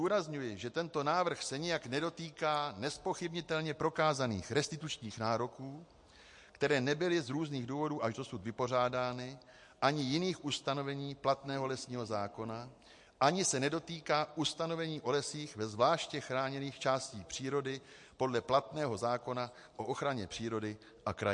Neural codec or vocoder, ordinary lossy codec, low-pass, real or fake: none; MP3, 48 kbps; 10.8 kHz; real